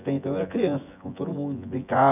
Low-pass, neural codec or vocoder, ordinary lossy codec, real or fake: 3.6 kHz; vocoder, 24 kHz, 100 mel bands, Vocos; none; fake